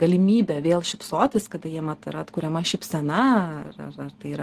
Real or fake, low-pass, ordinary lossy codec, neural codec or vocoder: real; 14.4 kHz; Opus, 16 kbps; none